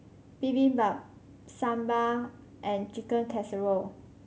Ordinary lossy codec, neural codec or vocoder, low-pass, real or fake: none; none; none; real